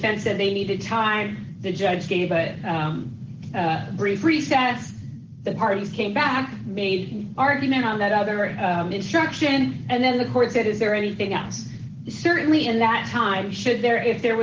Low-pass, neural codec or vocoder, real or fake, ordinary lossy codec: 7.2 kHz; none; real; Opus, 16 kbps